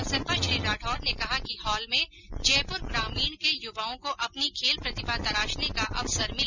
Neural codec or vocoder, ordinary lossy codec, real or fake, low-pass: none; none; real; 7.2 kHz